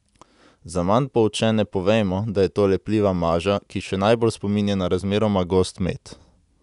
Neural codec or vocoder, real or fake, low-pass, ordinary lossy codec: none; real; 10.8 kHz; none